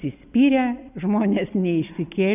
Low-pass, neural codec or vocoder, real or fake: 3.6 kHz; none; real